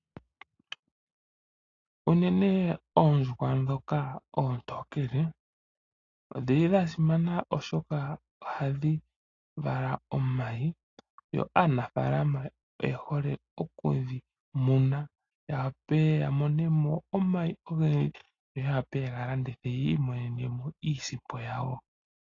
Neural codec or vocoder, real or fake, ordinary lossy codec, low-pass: none; real; AAC, 32 kbps; 7.2 kHz